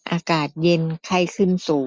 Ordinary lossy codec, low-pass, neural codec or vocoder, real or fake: none; none; none; real